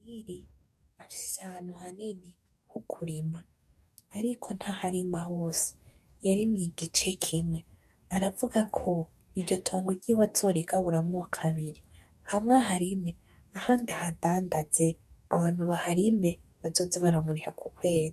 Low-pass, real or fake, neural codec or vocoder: 14.4 kHz; fake; codec, 44.1 kHz, 2.6 kbps, DAC